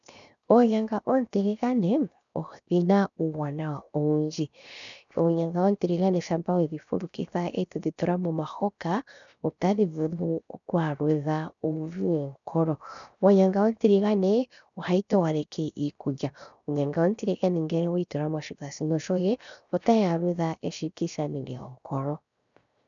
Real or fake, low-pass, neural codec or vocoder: fake; 7.2 kHz; codec, 16 kHz, 0.7 kbps, FocalCodec